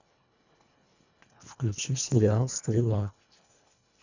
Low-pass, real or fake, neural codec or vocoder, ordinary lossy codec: 7.2 kHz; fake; codec, 24 kHz, 1.5 kbps, HILCodec; none